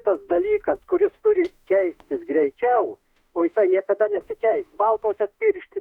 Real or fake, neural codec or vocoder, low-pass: fake; autoencoder, 48 kHz, 32 numbers a frame, DAC-VAE, trained on Japanese speech; 19.8 kHz